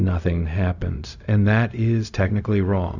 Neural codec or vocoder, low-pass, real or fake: codec, 16 kHz, 0.4 kbps, LongCat-Audio-Codec; 7.2 kHz; fake